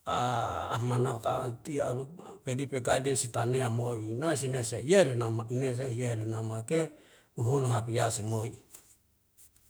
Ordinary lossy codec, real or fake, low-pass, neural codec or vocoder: none; fake; none; autoencoder, 48 kHz, 32 numbers a frame, DAC-VAE, trained on Japanese speech